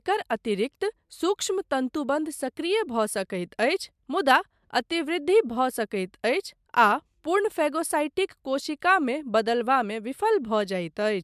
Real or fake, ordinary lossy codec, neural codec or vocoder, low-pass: real; MP3, 96 kbps; none; 14.4 kHz